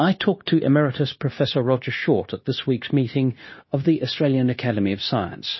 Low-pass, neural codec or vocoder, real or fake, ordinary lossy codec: 7.2 kHz; codec, 16 kHz, 0.9 kbps, LongCat-Audio-Codec; fake; MP3, 24 kbps